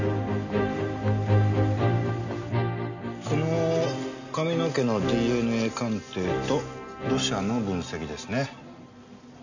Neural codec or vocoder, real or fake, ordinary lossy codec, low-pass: none; real; none; 7.2 kHz